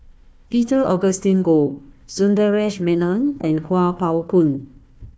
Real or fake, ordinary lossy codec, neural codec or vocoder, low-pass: fake; none; codec, 16 kHz, 1 kbps, FunCodec, trained on Chinese and English, 50 frames a second; none